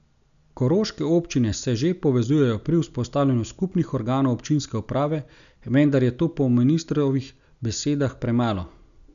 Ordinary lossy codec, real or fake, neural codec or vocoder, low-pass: none; real; none; 7.2 kHz